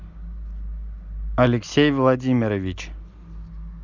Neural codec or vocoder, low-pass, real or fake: none; 7.2 kHz; real